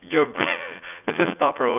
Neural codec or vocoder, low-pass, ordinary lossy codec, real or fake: vocoder, 44.1 kHz, 80 mel bands, Vocos; 3.6 kHz; none; fake